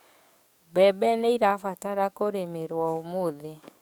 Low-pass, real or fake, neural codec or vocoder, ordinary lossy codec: none; fake; codec, 44.1 kHz, 7.8 kbps, DAC; none